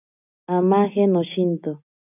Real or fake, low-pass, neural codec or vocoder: real; 3.6 kHz; none